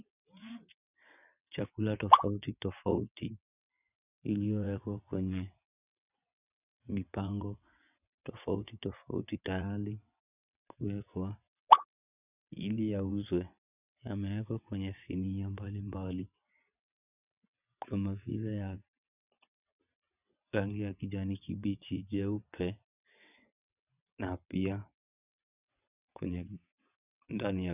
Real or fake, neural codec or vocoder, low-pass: fake; vocoder, 22.05 kHz, 80 mel bands, WaveNeXt; 3.6 kHz